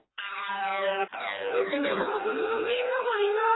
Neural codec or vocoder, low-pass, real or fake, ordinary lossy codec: codec, 16 kHz, 2 kbps, FreqCodec, smaller model; 7.2 kHz; fake; AAC, 16 kbps